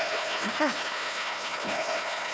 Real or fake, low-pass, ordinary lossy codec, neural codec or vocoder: fake; none; none; codec, 16 kHz, 1 kbps, FunCodec, trained on Chinese and English, 50 frames a second